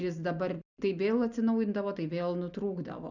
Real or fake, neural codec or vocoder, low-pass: real; none; 7.2 kHz